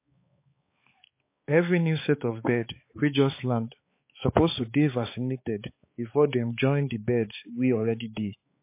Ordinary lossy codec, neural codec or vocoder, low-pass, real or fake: MP3, 24 kbps; codec, 16 kHz, 4 kbps, X-Codec, HuBERT features, trained on balanced general audio; 3.6 kHz; fake